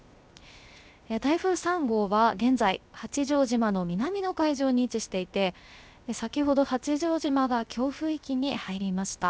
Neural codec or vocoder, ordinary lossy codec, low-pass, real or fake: codec, 16 kHz, 0.7 kbps, FocalCodec; none; none; fake